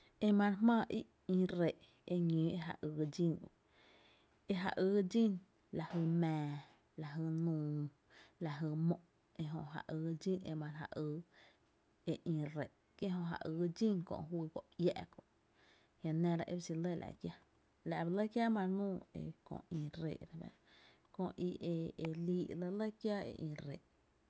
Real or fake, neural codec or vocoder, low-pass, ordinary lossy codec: real; none; none; none